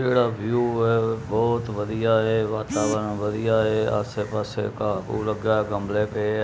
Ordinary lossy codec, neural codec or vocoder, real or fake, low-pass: none; none; real; none